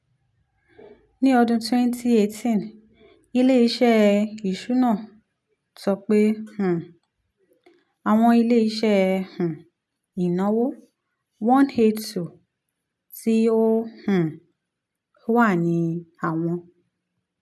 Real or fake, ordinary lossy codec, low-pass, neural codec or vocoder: real; none; none; none